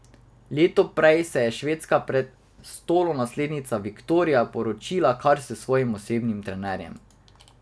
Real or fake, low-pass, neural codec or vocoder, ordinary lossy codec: real; none; none; none